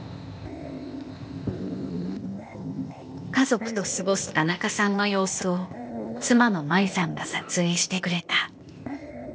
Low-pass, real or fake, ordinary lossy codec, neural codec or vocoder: none; fake; none; codec, 16 kHz, 0.8 kbps, ZipCodec